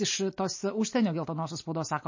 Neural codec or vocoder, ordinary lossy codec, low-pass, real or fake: none; MP3, 32 kbps; 7.2 kHz; real